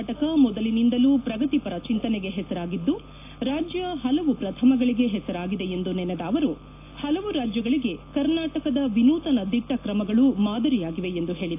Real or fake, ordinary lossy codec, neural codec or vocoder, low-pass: real; AAC, 24 kbps; none; 3.6 kHz